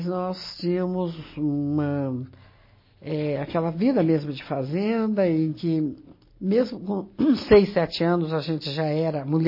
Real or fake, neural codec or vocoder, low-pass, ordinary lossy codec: real; none; 5.4 kHz; MP3, 24 kbps